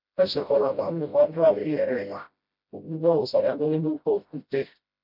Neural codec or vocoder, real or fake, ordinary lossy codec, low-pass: codec, 16 kHz, 0.5 kbps, FreqCodec, smaller model; fake; MP3, 48 kbps; 5.4 kHz